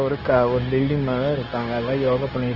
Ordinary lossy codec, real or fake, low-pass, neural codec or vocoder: Opus, 16 kbps; fake; 5.4 kHz; codec, 16 kHz, 0.9 kbps, LongCat-Audio-Codec